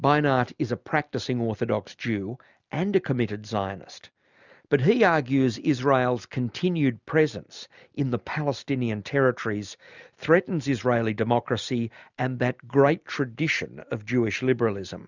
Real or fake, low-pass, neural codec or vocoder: real; 7.2 kHz; none